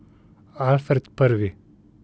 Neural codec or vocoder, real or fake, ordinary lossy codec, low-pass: none; real; none; none